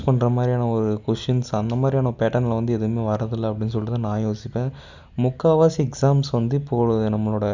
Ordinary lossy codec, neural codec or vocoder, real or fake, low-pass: Opus, 64 kbps; none; real; 7.2 kHz